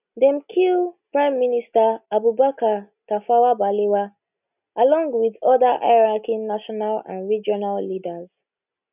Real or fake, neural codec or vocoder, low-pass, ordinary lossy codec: real; none; 3.6 kHz; none